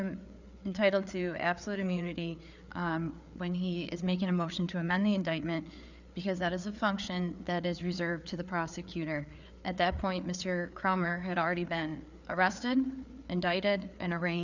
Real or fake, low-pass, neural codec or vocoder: fake; 7.2 kHz; codec, 16 kHz, 4 kbps, FreqCodec, larger model